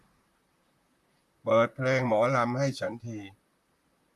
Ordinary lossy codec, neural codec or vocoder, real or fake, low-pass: MP3, 64 kbps; vocoder, 44.1 kHz, 128 mel bands, Pupu-Vocoder; fake; 14.4 kHz